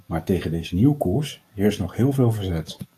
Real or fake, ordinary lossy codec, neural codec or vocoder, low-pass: fake; AAC, 64 kbps; codec, 44.1 kHz, 7.8 kbps, DAC; 14.4 kHz